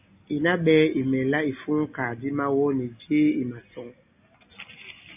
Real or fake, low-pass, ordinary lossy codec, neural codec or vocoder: real; 3.6 kHz; AAC, 32 kbps; none